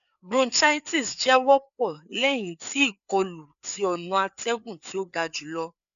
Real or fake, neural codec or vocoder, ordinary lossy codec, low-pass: fake; codec, 16 kHz, 4 kbps, FreqCodec, larger model; none; 7.2 kHz